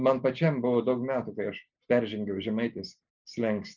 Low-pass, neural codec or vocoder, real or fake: 7.2 kHz; none; real